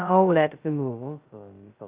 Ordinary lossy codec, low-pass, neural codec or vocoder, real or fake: Opus, 32 kbps; 3.6 kHz; codec, 16 kHz, 0.2 kbps, FocalCodec; fake